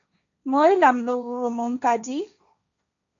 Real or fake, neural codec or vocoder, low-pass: fake; codec, 16 kHz, 1.1 kbps, Voila-Tokenizer; 7.2 kHz